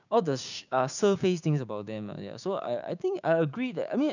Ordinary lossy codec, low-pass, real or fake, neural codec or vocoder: none; 7.2 kHz; fake; codec, 16 kHz, 6 kbps, DAC